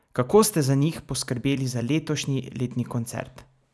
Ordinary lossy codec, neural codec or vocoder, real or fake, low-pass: none; none; real; none